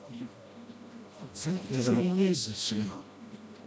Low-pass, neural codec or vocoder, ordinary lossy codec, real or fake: none; codec, 16 kHz, 1 kbps, FreqCodec, smaller model; none; fake